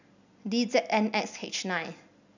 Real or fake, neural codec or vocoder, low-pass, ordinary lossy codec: real; none; 7.2 kHz; none